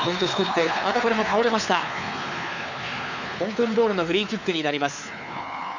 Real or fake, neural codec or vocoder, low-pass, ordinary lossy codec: fake; codec, 16 kHz, 4 kbps, X-Codec, WavLM features, trained on Multilingual LibriSpeech; 7.2 kHz; none